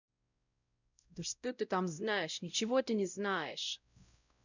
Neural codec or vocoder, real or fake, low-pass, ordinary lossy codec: codec, 16 kHz, 0.5 kbps, X-Codec, WavLM features, trained on Multilingual LibriSpeech; fake; 7.2 kHz; none